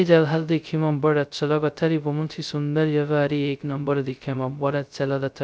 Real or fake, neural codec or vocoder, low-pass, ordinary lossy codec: fake; codec, 16 kHz, 0.2 kbps, FocalCodec; none; none